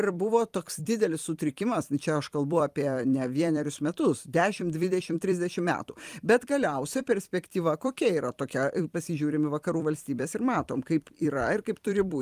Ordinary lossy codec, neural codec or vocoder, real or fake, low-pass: Opus, 32 kbps; vocoder, 44.1 kHz, 128 mel bands every 256 samples, BigVGAN v2; fake; 14.4 kHz